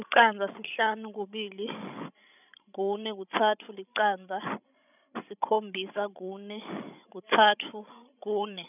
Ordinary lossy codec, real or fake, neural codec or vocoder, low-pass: none; fake; codec, 16 kHz, 16 kbps, FreqCodec, larger model; 3.6 kHz